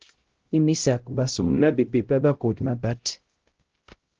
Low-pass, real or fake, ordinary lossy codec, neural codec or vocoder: 7.2 kHz; fake; Opus, 16 kbps; codec, 16 kHz, 0.5 kbps, X-Codec, HuBERT features, trained on LibriSpeech